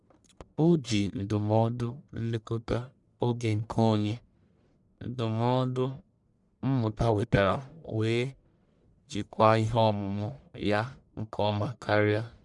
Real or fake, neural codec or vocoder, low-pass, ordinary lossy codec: fake; codec, 44.1 kHz, 1.7 kbps, Pupu-Codec; 10.8 kHz; none